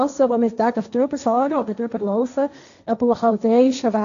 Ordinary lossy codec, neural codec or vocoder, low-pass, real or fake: none; codec, 16 kHz, 1.1 kbps, Voila-Tokenizer; 7.2 kHz; fake